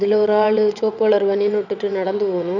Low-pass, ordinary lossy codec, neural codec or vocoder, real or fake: 7.2 kHz; none; vocoder, 22.05 kHz, 80 mel bands, WaveNeXt; fake